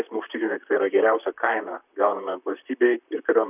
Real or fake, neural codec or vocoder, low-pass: fake; vocoder, 44.1 kHz, 128 mel bands, Pupu-Vocoder; 3.6 kHz